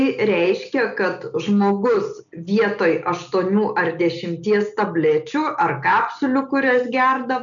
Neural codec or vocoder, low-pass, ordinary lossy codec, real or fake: none; 7.2 kHz; MP3, 96 kbps; real